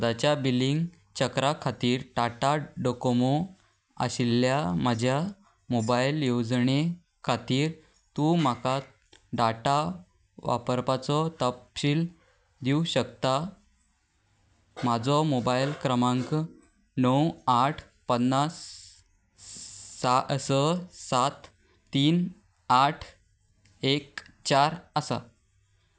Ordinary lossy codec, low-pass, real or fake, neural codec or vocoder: none; none; real; none